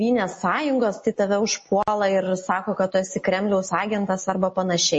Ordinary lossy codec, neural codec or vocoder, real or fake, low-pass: MP3, 32 kbps; none; real; 9.9 kHz